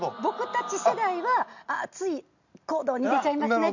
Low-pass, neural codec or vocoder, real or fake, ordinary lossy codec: 7.2 kHz; none; real; none